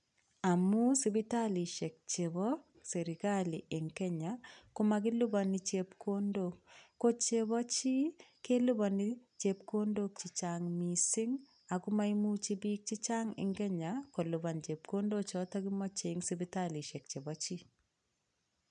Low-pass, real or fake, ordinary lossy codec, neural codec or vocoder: 9.9 kHz; real; none; none